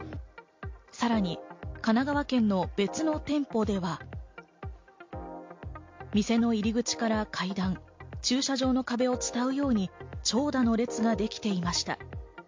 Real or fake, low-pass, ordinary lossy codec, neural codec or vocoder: real; 7.2 kHz; MP3, 48 kbps; none